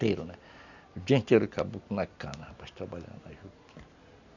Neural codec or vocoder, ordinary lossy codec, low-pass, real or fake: none; none; 7.2 kHz; real